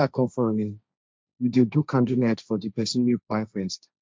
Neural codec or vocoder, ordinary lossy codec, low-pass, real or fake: codec, 16 kHz, 1.1 kbps, Voila-Tokenizer; none; none; fake